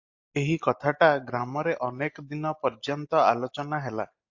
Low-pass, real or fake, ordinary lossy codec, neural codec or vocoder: 7.2 kHz; real; AAC, 48 kbps; none